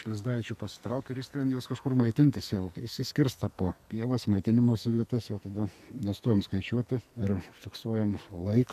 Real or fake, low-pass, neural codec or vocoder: fake; 14.4 kHz; codec, 44.1 kHz, 2.6 kbps, SNAC